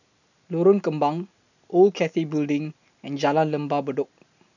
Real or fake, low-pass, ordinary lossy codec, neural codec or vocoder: real; 7.2 kHz; none; none